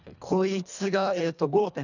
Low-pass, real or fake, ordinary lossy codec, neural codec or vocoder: 7.2 kHz; fake; none; codec, 24 kHz, 1.5 kbps, HILCodec